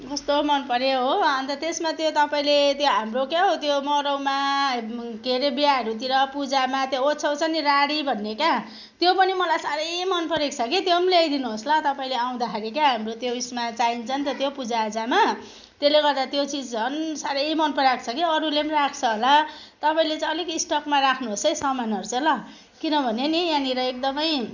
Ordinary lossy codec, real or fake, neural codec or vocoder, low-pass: none; real; none; 7.2 kHz